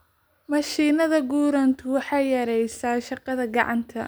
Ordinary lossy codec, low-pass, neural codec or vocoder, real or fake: none; none; none; real